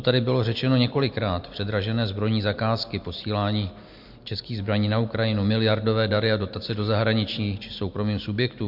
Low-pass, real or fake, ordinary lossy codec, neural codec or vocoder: 5.4 kHz; real; MP3, 48 kbps; none